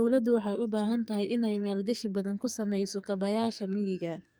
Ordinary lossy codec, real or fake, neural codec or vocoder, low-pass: none; fake; codec, 44.1 kHz, 2.6 kbps, SNAC; none